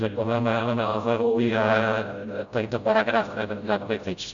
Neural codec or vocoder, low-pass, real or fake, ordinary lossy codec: codec, 16 kHz, 0.5 kbps, FreqCodec, smaller model; 7.2 kHz; fake; Opus, 64 kbps